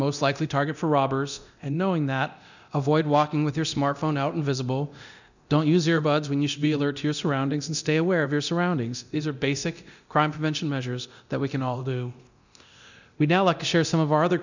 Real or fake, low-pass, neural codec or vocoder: fake; 7.2 kHz; codec, 24 kHz, 0.9 kbps, DualCodec